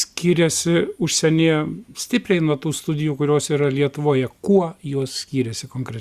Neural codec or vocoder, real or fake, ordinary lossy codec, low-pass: none; real; Opus, 64 kbps; 14.4 kHz